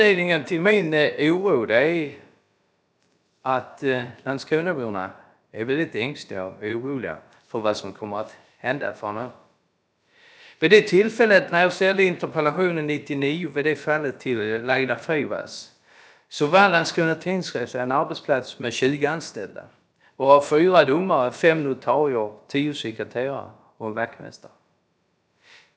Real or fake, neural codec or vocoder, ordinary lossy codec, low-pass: fake; codec, 16 kHz, about 1 kbps, DyCAST, with the encoder's durations; none; none